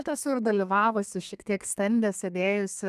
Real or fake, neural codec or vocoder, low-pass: fake; codec, 32 kHz, 1.9 kbps, SNAC; 14.4 kHz